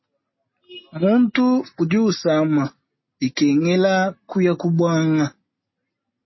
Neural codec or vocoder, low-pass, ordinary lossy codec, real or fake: none; 7.2 kHz; MP3, 24 kbps; real